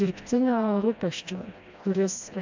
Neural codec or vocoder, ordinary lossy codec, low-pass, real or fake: codec, 16 kHz, 1 kbps, FreqCodec, smaller model; MP3, 64 kbps; 7.2 kHz; fake